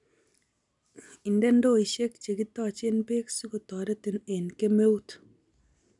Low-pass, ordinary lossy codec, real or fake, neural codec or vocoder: 10.8 kHz; none; real; none